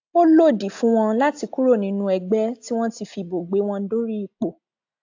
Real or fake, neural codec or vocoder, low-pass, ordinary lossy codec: real; none; 7.2 kHz; AAC, 48 kbps